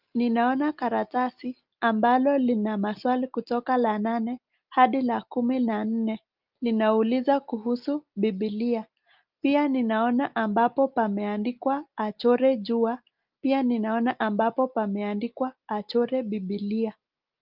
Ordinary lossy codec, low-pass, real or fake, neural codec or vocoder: Opus, 32 kbps; 5.4 kHz; real; none